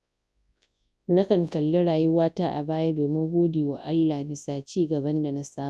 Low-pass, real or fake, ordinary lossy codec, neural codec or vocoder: none; fake; none; codec, 24 kHz, 0.9 kbps, WavTokenizer, large speech release